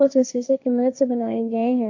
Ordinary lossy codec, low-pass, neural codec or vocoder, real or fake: AAC, 48 kbps; 7.2 kHz; codec, 16 kHz, 1.1 kbps, Voila-Tokenizer; fake